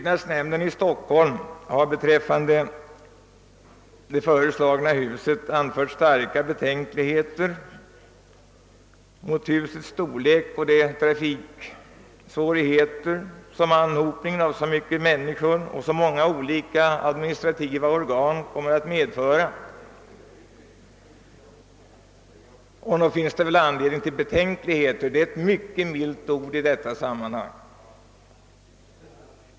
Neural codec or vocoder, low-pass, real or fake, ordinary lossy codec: none; none; real; none